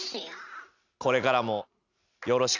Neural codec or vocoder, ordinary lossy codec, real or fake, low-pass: none; none; real; 7.2 kHz